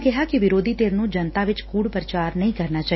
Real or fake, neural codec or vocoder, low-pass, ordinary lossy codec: real; none; 7.2 kHz; MP3, 24 kbps